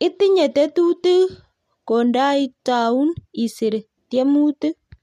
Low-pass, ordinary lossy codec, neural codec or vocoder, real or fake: 14.4 kHz; MP3, 64 kbps; none; real